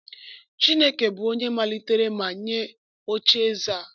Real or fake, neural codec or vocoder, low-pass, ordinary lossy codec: real; none; 7.2 kHz; none